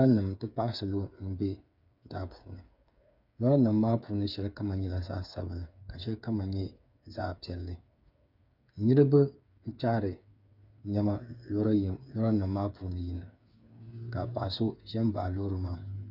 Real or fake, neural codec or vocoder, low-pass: fake; codec, 16 kHz, 8 kbps, FreqCodec, smaller model; 5.4 kHz